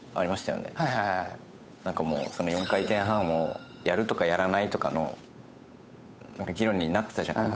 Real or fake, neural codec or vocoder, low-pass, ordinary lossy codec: fake; codec, 16 kHz, 8 kbps, FunCodec, trained on Chinese and English, 25 frames a second; none; none